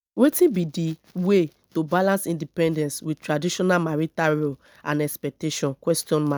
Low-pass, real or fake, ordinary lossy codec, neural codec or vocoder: none; real; none; none